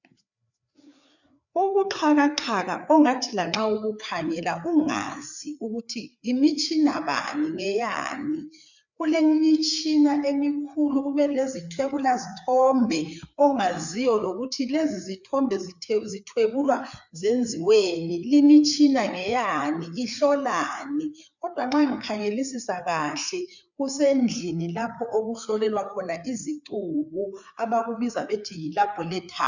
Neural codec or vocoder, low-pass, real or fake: codec, 16 kHz, 4 kbps, FreqCodec, larger model; 7.2 kHz; fake